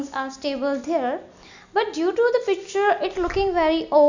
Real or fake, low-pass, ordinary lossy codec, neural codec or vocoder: real; 7.2 kHz; none; none